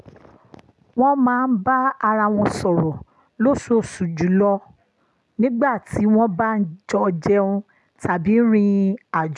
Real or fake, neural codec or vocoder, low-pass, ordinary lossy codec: real; none; none; none